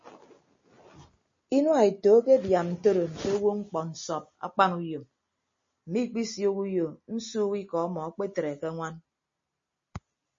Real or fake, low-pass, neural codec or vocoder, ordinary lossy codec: real; 7.2 kHz; none; MP3, 32 kbps